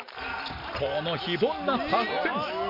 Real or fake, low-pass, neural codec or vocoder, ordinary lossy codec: real; 5.4 kHz; none; MP3, 32 kbps